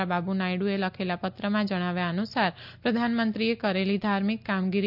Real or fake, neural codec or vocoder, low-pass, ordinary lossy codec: real; none; 5.4 kHz; none